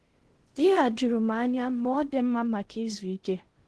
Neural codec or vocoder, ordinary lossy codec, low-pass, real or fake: codec, 16 kHz in and 24 kHz out, 0.6 kbps, FocalCodec, streaming, 4096 codes; Opus, 16 kbps; 10.8 kHz; fake